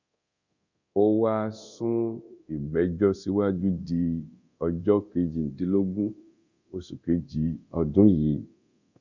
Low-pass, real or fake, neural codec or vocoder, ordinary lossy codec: 7.2 kHz; fake; codec, 24 kHz, 0.9 kbps, DualCodec; Opus, 64 kbps